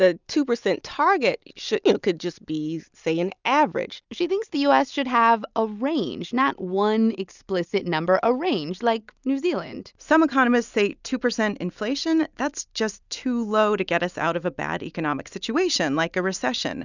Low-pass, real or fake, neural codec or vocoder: 7.2 kHz; real; none